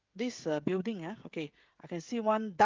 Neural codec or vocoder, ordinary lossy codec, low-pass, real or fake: codec, 16 kHz, 16 kbps, FreqCodec, smaller model; Opus, 32 kbps; 7.2 kHz; fake